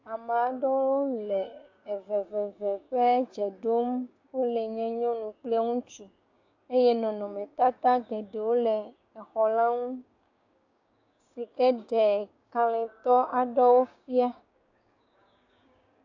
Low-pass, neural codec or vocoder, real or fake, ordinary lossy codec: 7.2 kHz; codec, 16 kHz, 6 kbps, DAC; fake; AAC, 48 kbps